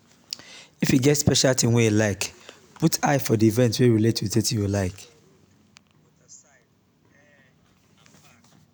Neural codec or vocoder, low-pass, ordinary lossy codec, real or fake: none; none; none; real